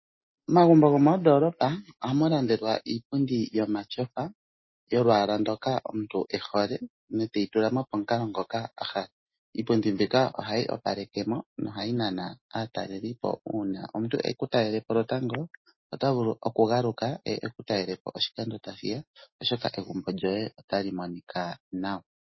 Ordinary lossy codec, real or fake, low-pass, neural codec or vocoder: MP3, 24 kbps; real; 7.2 kHz; none